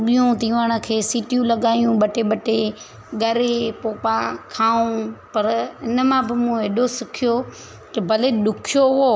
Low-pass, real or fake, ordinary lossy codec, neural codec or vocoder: none; real; none; none